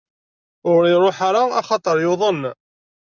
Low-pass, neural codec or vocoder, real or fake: 7.2 kHz; none; real